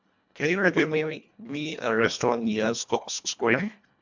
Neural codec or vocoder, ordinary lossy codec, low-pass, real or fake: codec, 24 kHz, 1.5 kbps, HILCodec; MP3, 64 kbps; 7.2 kHz; fake